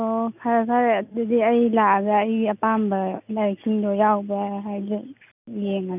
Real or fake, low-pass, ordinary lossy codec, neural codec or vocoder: real; 3.6 kHz; none; none